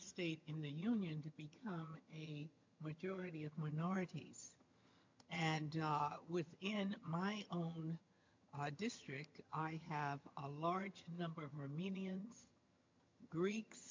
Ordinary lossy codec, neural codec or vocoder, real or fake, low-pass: MP3, 48 kbps; vocoder, 22.05 kHz, 80 mel bands, HiFi-GAN; fake; 7.2 kHz